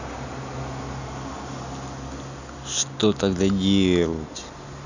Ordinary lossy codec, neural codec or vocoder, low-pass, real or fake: none; none; 7.2 kHz; real